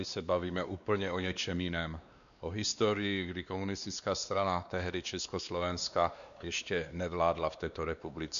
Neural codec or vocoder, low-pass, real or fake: codec, 16 kHz, 2 kbps, X-Codec, WavLM features, trained on Multilingual LibriSpeech; 7.2 kHz; fake